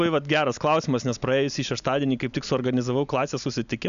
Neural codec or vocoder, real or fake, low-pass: none; real; 7.2 kHz